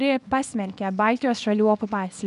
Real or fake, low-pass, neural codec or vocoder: fake; 10.8 kHz; codec, 24 kHz, 0.9 kbps, WavTokenizer, small release